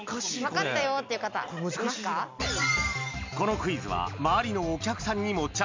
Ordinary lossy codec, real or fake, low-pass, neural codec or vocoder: MP3, 64 kbps; real; 7.2 kHz; none